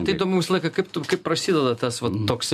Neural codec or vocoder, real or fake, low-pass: none; real; 14.4 kHz